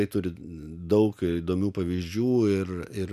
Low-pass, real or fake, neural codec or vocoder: 14.4 kHz; real; none